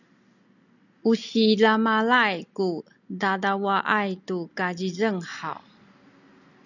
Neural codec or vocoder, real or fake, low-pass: none; real; 7.2 kHz